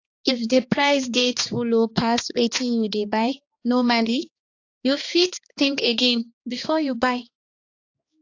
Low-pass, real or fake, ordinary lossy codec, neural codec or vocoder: 7.2 kHz; fake; AAC, 48 kbps; codec, 16 kHz, 2 kbps, X-Codec, HuBERT features, trained on balanced general audio